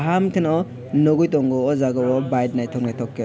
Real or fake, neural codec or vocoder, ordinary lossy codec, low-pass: real; none; none; none